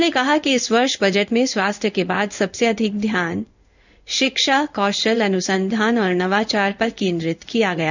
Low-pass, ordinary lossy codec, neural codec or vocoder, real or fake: 7.2 kHz; none; codec, 16 kHz in and 24 kHz out, 1 kbps, XY-Tokenizer; fake